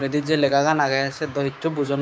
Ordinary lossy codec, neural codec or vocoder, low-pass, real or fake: none; codec, 16 kHz, 6 kbps, DAC; none; fake